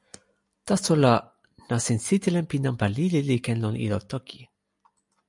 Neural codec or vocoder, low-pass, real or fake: none; 10.8 kHz; real